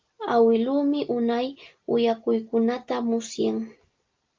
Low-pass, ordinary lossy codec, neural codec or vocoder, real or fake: 7.2 kHz; Opus, 32 kbps; none; real